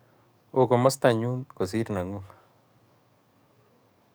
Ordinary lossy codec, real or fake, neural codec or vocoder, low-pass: none; fake; codec, 44.1 kHz, 7.8 kbps, DAC; none